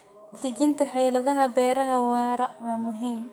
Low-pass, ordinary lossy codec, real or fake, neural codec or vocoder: none; none; fake; codec, 44.1 kHz, 2.6 kbps, SNAC